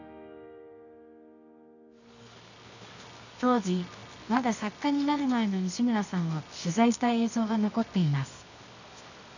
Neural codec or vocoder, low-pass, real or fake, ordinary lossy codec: codec, 24 kHz, 0.9 kbps, WavTokenizer, medium music audio release; 7.2 kHz; fake; none